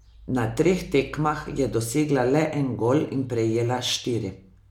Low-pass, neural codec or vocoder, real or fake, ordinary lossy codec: 19.8 kHz; none; real; MP3, 96 kbps